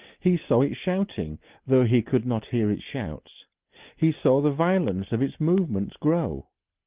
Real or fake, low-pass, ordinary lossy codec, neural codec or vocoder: real; 3.6 kHz; Opus, 32 kbps; none